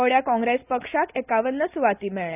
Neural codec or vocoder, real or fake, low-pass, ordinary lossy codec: none; real; 3.6 kHz; none